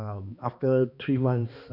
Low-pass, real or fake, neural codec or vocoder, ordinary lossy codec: 5.4 kHz; fake; codec, 16 kHz, 2 kbps, X-Codec, HuBERT features, trained on balanced general audio; none